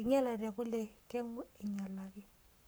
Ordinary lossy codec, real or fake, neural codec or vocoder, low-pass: none; fake; codec, 44.1 kHz, 7.8 kbps, Pupu-Codec; none